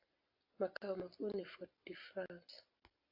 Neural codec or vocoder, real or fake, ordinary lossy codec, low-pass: none; real; AAC, 48 kbps; 5.4 kHz